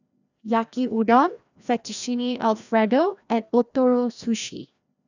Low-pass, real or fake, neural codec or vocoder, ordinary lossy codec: 7.2 kHz; fake; codec, 16 kHz, 1 kbps, FreqCodec, larger model; none